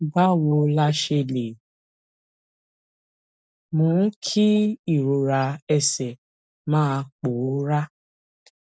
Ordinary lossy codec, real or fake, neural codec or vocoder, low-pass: none; real; none; none